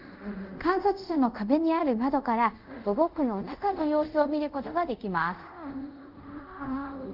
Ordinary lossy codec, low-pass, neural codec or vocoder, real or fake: Opus, 24 kbps; 5.4 kHz; codec, 24 kHz, 0.5 kbps, DualCodec; fake